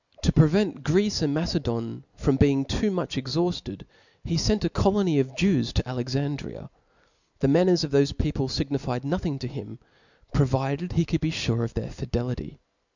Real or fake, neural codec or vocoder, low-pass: real; none; 7.2 kHz